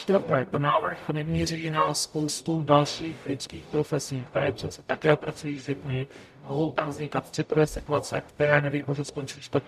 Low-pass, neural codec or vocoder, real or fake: 14.4 kHz; codec, 44.1 kHz, 0.9 kbps, DAC; fake